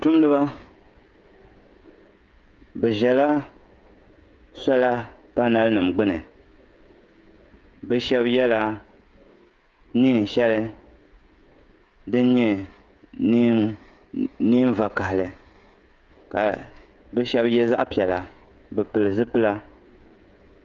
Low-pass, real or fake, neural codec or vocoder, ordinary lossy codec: 7.2 kHz; fake; codec, 16 kHz, 16 kbps, FreqCodec, smaller model; Opus, 32 kbps